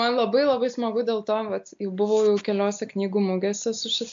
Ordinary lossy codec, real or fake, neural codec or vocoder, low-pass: AAC, 64 kbps; real; none; 7.2 kHz